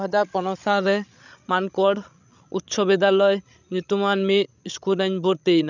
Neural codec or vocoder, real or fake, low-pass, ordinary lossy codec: codec, 16 kHz, 16 kbps, FreqCodec, larger model; fake; 7.2 kHz; none